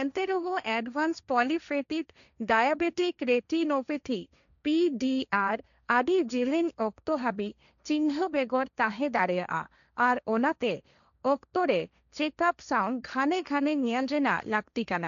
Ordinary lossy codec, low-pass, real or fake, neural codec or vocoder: none; 7.2 kHz; fake; codec, 16 kHz, 1.1 kbps, Voila-Tokenizer